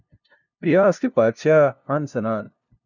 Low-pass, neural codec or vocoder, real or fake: 7.2 kHz; codec, 16 kHz, 0.5 kbps, FunCodec, trained on LibriTTS, 25 frames a second; fake